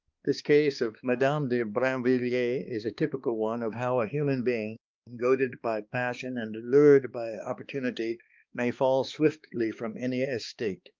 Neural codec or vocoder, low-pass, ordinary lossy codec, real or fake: codec, 16 kHz, 2 kbps, X-Codec, HuBERT features, trained on balanced general audio; 7.2 kHz; Opus, 24 kbps; fake